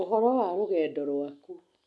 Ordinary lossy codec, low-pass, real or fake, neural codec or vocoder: none; none; real; none